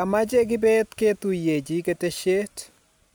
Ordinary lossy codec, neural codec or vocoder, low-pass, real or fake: none; none; none; real